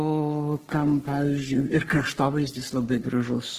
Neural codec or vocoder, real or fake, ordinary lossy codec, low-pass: codec, 44.1 kHz, 3.4 kbps, Pupu-Codec; fake; Opus, 16 kbps; 14.4 kHz